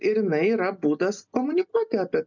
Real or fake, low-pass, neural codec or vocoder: real; 7.2 kHz; none